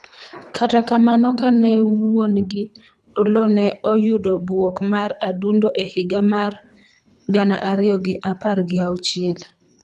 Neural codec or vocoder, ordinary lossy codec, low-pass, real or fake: codec, 24 kHz, 3 kbps, HILCodec; none; none; fake